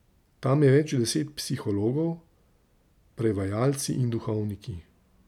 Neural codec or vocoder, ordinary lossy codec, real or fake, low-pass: none; none; real; 19.8 kHz